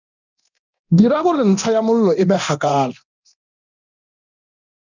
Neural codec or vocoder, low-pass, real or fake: codec, 24 kHz, 0.9 kbps, DualCodec; 7.2 kHz; fake